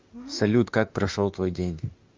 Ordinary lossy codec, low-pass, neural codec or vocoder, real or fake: Opus, 24 kbps; 7.2 kHz; autoencoder, 48 kHz, 32 numbers a frame, DAC-VAE, trained on Japanese speech; fake